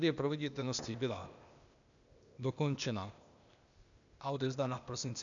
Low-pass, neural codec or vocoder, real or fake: 7.2 kHz; codec, 16 kHz, 0.8 kbps, ZipCodec; fake